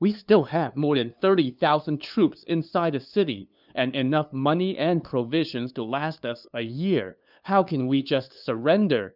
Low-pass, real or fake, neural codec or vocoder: 5.4 kHz; fake; codec, 16 kHz, 2 kbps, FunCodec, trained on LibriTTS, 25 frames a second